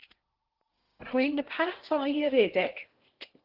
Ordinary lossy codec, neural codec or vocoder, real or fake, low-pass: Opus, 16 kbps; codec, 16 kHz in and 24 kHz out, 0.8 kbps, FocalCodec, streaming, 65536 codes; fake; 5.4 kHz